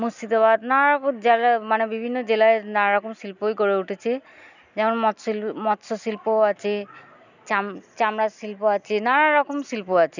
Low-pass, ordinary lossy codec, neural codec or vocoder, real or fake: 7.2 kHz; none; none; real